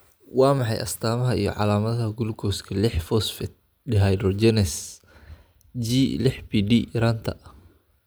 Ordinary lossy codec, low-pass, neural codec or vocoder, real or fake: none; none; none; real